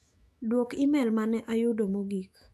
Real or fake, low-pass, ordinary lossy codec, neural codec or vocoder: fake; 14.4 kHz; none; autoencoder, 48 kHz, 128 numbers a frame, DAC-VAE, trained on Japanese speech